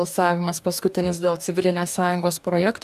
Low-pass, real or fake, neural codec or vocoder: 14.4 kHz; fake; codec, 44.1 kHz, 2.6 kbps, DAC